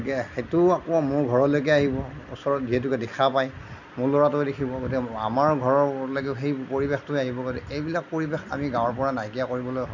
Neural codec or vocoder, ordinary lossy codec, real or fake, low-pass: none; none; real; 7.2 kHz